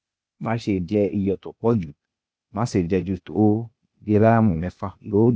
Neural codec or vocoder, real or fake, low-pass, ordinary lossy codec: codec, 16 kHz, 0.8 kbps, ZipCodec; fake; none; none